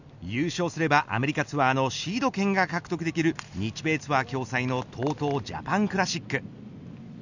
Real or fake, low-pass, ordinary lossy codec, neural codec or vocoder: real; 7.2 kHz; none; none